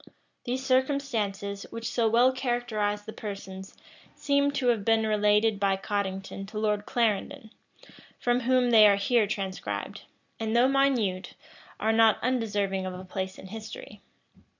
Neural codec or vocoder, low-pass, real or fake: none; 7.2 kHz; real